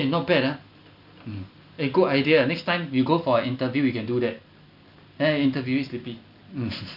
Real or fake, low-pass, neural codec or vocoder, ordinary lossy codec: real; 5.4 kHz; none; none